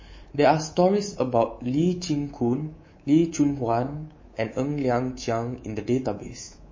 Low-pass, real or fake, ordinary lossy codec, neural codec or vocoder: 7.2 kHz; fake; MP3, 32 kbps; codec, 24 kHz, 3.1 kbps, DualCodec